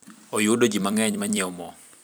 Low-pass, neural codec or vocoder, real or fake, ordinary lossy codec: none; vocoder, 44.1 kHz, 128 mel bands every 256 samples, BigVGAN v2; fake; none